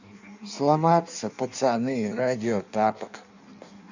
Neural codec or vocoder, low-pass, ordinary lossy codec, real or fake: codec, 16 kHz in and 24 kHz out, 1.1 kbps, FireRedTTS-2 codec; 7.2 kHz; none; fake